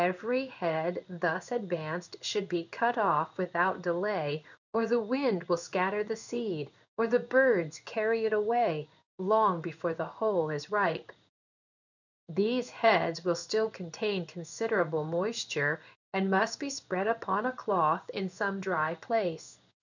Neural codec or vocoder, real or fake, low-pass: codec, 16 kHz in and 24 kHz out, 1 kbps, XY-Tokenizer; fake; 7.2 kHz